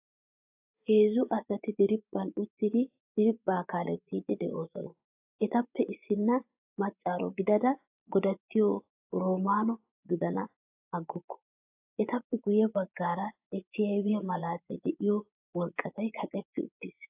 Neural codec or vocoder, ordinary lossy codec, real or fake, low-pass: vocoder, 24 kHz, 100 mel bands, Vocos; AAC, 32 kbps; fake; 3.6 kHz